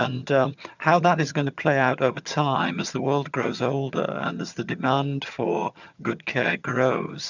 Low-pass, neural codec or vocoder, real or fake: 7.2 kHz; vocoder, 22.05 kHz, 80 mel bands, HiFi-GAN; fake